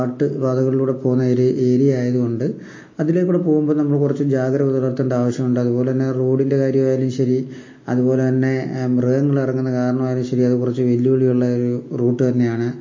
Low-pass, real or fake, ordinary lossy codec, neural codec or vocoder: 7.2 kHz; real; MP3, 32 kbps; none